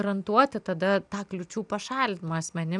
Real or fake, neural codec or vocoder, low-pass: real; none; 10.8 kHz